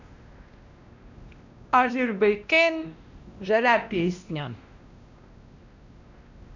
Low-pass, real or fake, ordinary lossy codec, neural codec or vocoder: 7.2 kHz; fake; none; codec, 16 kHz, 1 kbps, X-Codec, WavLM features, trained on Multilingual LibriSpeech